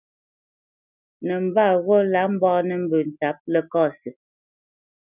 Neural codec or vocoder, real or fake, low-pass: none; real; 3.6 kHz